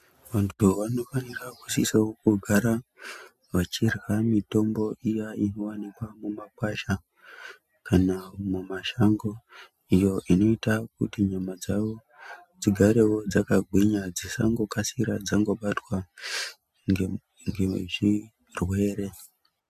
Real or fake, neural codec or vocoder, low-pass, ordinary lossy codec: real; none; 14.4 kHz; MP3, 96 kbps